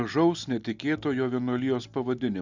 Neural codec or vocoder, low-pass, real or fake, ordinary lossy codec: none; 7.2 kHz; real; Opus, 64 kbps